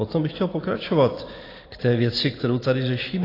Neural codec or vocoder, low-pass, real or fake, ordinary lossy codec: none; 5.4 kHz; real; AAC, 24 kbps